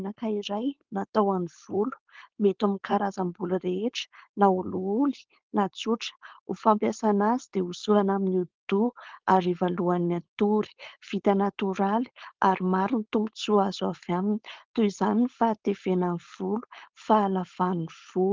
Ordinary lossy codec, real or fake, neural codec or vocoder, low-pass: Opus, 16 kbps; fake; codec, 16 kHz, 4.8 kbps, FACodec; 7.2 kHz